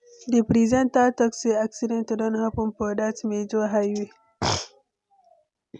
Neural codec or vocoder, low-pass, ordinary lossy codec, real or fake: none; 10.8 kHz; none; real